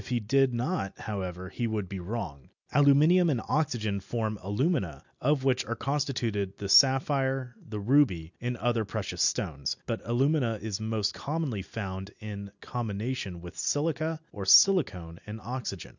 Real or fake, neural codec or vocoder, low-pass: real; none; 7.2 kHz